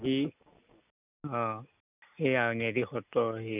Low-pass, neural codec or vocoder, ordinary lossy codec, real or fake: 3.6 kHz; none; none; real